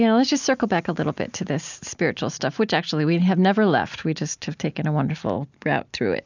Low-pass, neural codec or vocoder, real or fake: 7.2 kHz; none; real